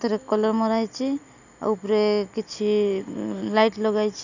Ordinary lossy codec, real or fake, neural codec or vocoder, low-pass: none; real; none; 7.2 kHz